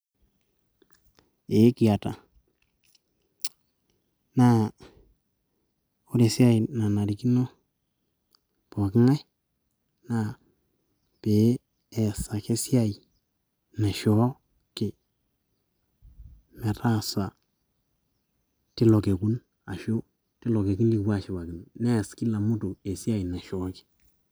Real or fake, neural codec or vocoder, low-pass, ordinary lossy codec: real; none; none; none